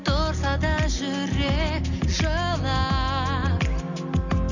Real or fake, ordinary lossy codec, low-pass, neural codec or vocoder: real; none; 7.2 kHz; none